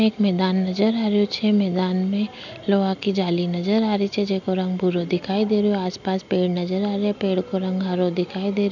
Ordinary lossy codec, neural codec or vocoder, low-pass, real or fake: none; none; 7.2 kHz; real